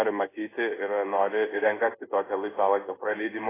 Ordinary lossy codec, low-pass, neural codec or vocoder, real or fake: AAC, 16 kbps; 3.6 kHz; codec, 16 kHz in and 24 kHz out, 1 kbps, XY-Tokenizer; fake